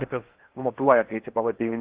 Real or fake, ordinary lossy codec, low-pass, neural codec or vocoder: fake; Opus, 16 kbps; 3.6 kHz; codec, 16 kHz in and 24 kHz out, 0.8 kbps, FocalCodec, streaming, 65536 codes